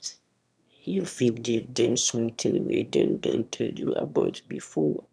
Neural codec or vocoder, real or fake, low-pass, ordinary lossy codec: autoencoder, 22.05 kHz, a latent of 192 numbers a frame, VITS, trained on one speaker; fake; none; none